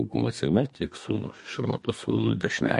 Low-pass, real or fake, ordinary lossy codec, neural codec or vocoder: 10.8 kHz; fake; MP3, 48 kbps; codec, 24 kHz, 1 kbps, SNAC